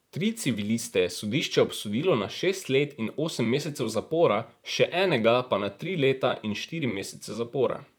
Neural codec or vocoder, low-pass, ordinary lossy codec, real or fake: vocoder, 44.1 kHz, 128 mel bands, Pupu-Vocoder; none; none; fake